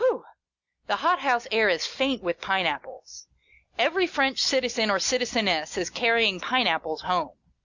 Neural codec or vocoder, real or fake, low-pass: none; real; 7.2 kHz